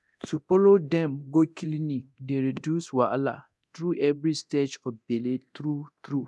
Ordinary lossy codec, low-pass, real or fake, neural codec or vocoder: none; none; fake; codec, 24 kHz, 0.9 kbps, DualCodec